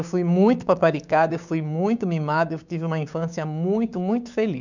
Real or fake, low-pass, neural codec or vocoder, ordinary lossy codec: fake; 7.2 kHz; codec, 16 kHz, 6 kbps, DAC; none